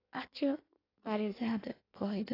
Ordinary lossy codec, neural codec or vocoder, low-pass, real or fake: AAC, 24 kbps; codec, 16 kHz in and 24 kHz out, 1.1 kbps, FireRedTTS-2 codec; 5.4 kHz; fake